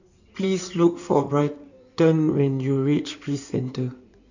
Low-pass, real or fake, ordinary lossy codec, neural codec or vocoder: 7.2 kHz; fake; none; codec, 16 kHz in and 24 kHz out, 2.2 kbps, FireRedTTS-2 codec